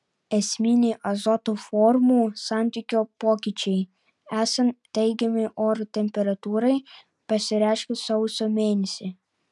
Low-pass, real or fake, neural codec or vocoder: 10.8 kHz; real; none